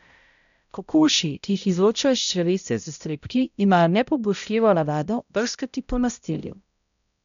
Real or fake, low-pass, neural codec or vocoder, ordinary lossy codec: fake; 7.2 kHz; codec, 16 kHz, 0.5 kbps, X-Codec, HuBERT features, trained on balanced general audio; MP3, 96 kbps